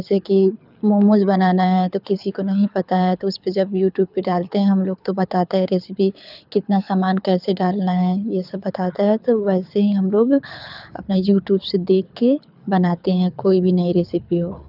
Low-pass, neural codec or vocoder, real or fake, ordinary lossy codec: 5.4 kHz; codec, 24 kHz, 6 kbps, HILCodec; fake; none